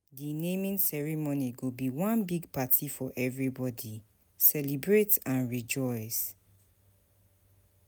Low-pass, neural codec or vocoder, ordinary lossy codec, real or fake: none; none; none; real